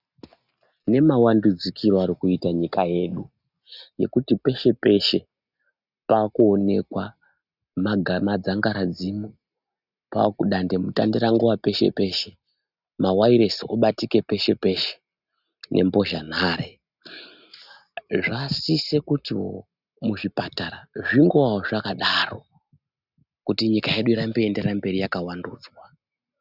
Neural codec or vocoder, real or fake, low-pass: none; real; 5.4 kHz